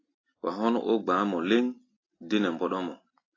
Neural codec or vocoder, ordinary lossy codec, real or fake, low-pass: none; AAC, 32 kbps; real; 7.2 kHz